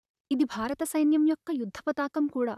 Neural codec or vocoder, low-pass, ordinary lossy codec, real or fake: vocoder, 44.1 kHz, 128 mel bands, Pupu-Vocoder; 14.4 kHz; none; fake